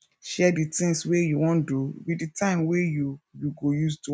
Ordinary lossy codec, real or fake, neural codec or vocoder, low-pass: none; real; none; none